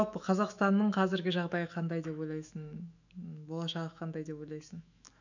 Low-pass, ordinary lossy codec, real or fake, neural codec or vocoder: 7.2 kHz; none; fake; autoencoder, 48 kHz, 128 numbers a frame, DAC-VAE, trained on Japanese speech